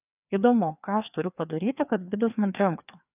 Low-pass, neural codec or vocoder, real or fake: 3.6 kHz; codec, 16 kHz, 2 kbps, FreqCodec, larger model; fake